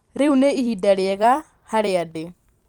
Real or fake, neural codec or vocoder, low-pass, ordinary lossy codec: fake; vocoder, 44.1 kHz, 128 mel bands every 256 samples, BigVGAN v2; 19.8 kHz; Opus, 32 kbps